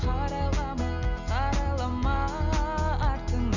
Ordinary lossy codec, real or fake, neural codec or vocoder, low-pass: none; real; none; 7.2 kHz